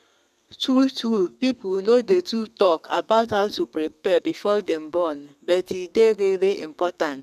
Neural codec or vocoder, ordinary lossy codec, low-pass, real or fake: codec, 32 kHz, 1.9 kbps, SNAC; none; 14.4 kHz; fake